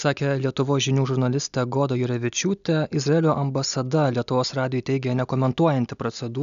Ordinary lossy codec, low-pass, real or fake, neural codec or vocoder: MP3, 96 kbps; 7.2 kHz; real; none